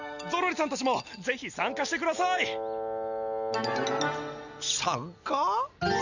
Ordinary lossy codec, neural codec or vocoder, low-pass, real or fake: none; none; 7.2 kHz; real